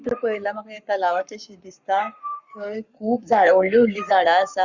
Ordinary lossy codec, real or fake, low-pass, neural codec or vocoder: Opus, 64 kbps; fake; 7.2 kHz; codec, 44.1 kHz, 7.8 kbps, Pupu-Codec